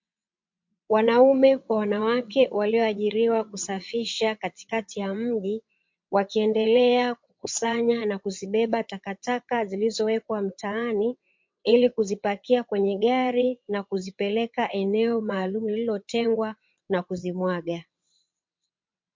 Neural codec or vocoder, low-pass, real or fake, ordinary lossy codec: vocoder, 22.05 kHz, 80 mel bands, WaveNeXt; 7.2 kHz; fake; MP3, 48 kbps